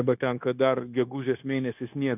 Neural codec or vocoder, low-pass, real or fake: codec, 16 kHz, 0.9 kbps, LongCat-Audio-Codec; 3.6 kHz; fake